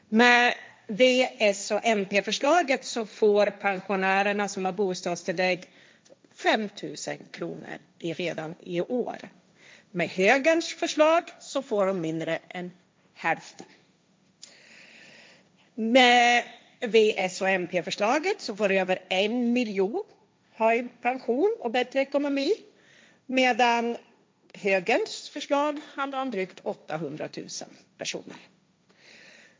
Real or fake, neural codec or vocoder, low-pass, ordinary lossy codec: fake; codec, 16 kHz, 1.1 kbps, Voila-Tokenizer; none; none